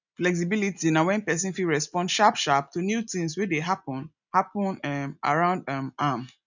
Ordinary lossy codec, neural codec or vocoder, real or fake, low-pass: none; none; real; 7.2 kHz